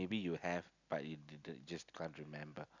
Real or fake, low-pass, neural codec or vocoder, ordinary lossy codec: real; 7.2 kHz; none; none